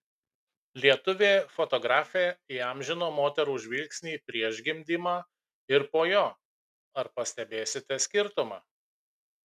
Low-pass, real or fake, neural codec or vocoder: 14.4 kHz; real; none